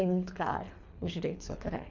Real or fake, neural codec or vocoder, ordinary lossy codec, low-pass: fake; codec, 24 kHz, 3 kbps, HILCodec; MP3, 64 kbps; 7.2 kHz